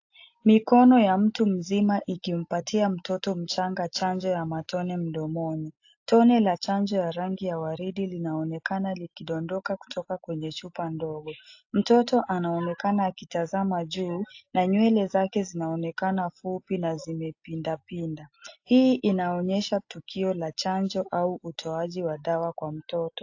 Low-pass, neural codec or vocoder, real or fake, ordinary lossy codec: 7.2 kHz; none; real; AAC, 48 kbps